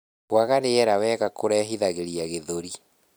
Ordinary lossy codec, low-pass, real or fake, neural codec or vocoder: none; none; fake; vocoder, 44.1 kHz, 128 mel bands every 256 samples, BigVGAN v2